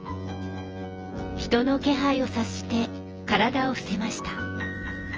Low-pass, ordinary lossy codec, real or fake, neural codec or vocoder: 7.2 kHz; Opus, 24 kbps; fake; vocoder, 24 kHz, 100 mel bands, Vocos